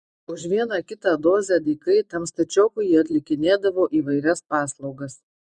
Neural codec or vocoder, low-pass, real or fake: vocoder, 24 kHz, 100 mel bands, Vocos; 10.8 kHz; fake